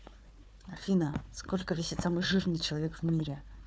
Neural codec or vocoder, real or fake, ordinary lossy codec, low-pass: codec, 16 kHz, 4 kbps, FunCodec, trained on Chinese and English, 50 frames a second; fake; none; none